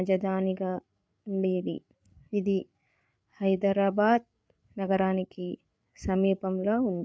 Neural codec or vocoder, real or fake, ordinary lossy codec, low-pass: codec, 16 kHz, 8 kbps, FreqCodec, larger model; fake; none; none